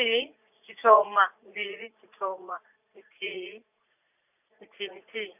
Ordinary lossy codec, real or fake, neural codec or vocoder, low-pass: none; fake; vocoder, 44.1 kHz, 80 mel bands, Vocos; 3.6 kHz